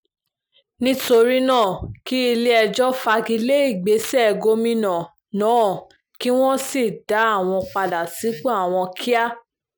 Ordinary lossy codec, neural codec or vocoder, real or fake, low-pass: none; none; real; none